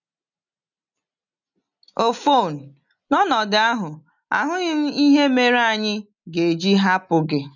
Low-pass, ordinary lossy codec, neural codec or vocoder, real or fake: 7.2 kHz; none; none; real